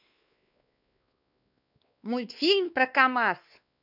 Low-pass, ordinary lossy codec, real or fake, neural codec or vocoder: 5.4 kHz; none; fake; codec, 16 kHz, 2 kbps, X-Codec, WavLM features, trained on Multilingual LibriSpeech